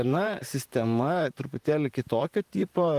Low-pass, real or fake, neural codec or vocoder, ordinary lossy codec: 14.4 kHz; fake; vocoder, 44.1 kHz, 128 mel bands, Pupu-Vocoder; Opus, 24 kbps